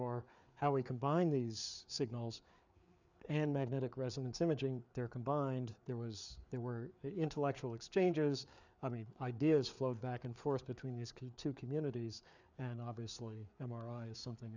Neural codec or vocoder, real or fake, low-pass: codec, 16 kHz, 4 kbps, FreqCodec, larger model; fake; 7.2 kHz